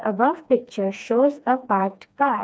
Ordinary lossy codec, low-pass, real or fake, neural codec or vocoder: none; none; fake; codec, 16 kHz, 2 kbps, FreqCodec, smaller model